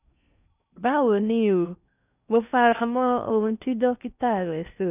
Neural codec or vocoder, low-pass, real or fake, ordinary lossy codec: codec, 16 kHz in and 24 kHz out, 0.6 kbps, FocalCodec, streaming, 4096 codes; 3.6 kHz; fake; none